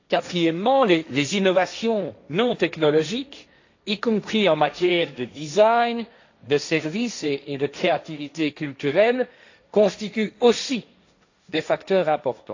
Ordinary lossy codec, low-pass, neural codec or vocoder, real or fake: AAC, 48 kbps; 7.2 kHz; codec, 16 kHz, 1.1 kbps, Voila-Tokenizer; fake